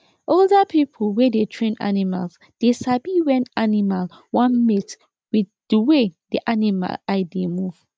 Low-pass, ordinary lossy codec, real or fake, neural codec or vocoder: none; none; real; none